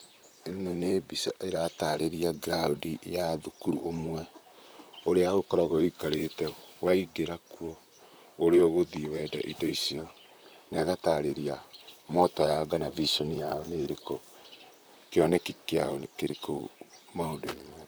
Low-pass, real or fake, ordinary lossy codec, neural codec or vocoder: none; fake; none; vocoder, 44.1 kHz, 128 mel bands, Pupu-Vocoder